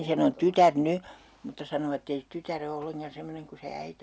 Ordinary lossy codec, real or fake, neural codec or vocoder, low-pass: none; real; none; none